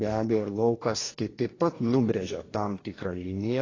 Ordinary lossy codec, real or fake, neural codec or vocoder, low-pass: AAC, 32 kbps; fake; codec, 44.1 kHz, 2.6 kbps, DAC; 7.2 kHz